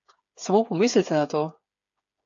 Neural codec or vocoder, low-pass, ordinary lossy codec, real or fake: codec, 16 kHz, 8 kbps, FreqCodec, smaller model; 7.2 kHz; AAC, 48 kbps; fake